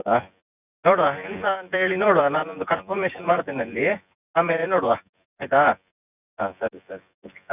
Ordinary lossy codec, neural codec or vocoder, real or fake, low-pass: none; vocoder, 24 kHz, 100 mel bands, Vocos; fake; 3.6 kHz